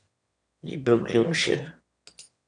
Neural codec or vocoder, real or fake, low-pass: autoencoder, 22.05 kHz, a latent of 192 numbers a frame, VITS, trained on one speaker; fake; 9.9 kHz